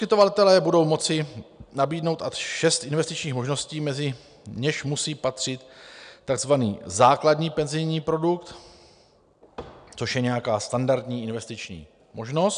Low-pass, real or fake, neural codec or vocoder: 9.9 kHz; real; none